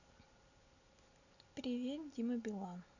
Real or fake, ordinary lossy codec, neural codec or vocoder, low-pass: real; none; none; 7.2 kHz